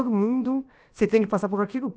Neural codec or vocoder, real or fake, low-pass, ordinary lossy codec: codec, 16 kHz, about 1 kbps, DyCAST, with the encoder's durations; fake; none; none